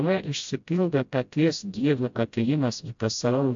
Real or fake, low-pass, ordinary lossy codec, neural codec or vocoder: fake; 7.2 kHz; AAC, 64 kbps; codec, 16 kHz, 0.5 kbps, FreqCodec, smaller model